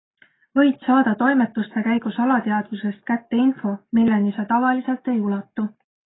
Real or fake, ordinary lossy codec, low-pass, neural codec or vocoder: real; AAC, 16 kbps; 7.2 kHz; none